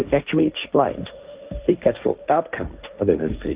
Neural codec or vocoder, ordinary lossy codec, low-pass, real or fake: codec, 16 kHz, 1.1 kbps, Voila-Tokenizer; Opus, 32 kbps; 3.6 kHz; fake